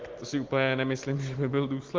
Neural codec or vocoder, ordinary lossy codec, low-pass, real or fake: none; Opus, 16 kbps; 7.2 kHz; real